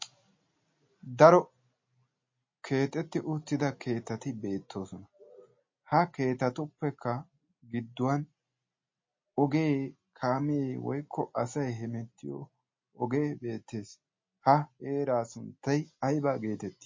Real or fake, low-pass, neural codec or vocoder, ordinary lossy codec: real; 7.2 kHz; none; MP3, 32 kbps